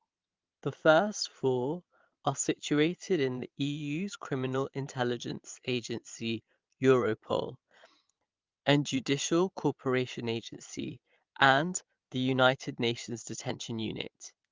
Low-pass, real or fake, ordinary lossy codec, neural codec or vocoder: 7.2 kHz; fake; Opus, 32 kbps; vocoder, 22.05 kHz, 80 mel bands, Vocos